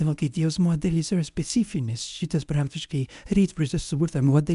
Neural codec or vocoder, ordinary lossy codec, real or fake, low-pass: codec, 24 kHz, 0.9 kbps, WavTokenizer, medium speech release version 1; AAC, 96 kbps; fake; 10.8 kHz